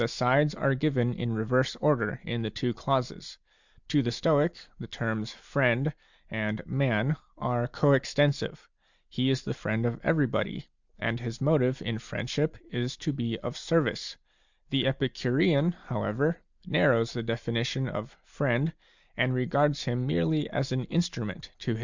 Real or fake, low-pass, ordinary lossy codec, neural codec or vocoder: real; 7.2 kHz; Opus, 64 kbps; none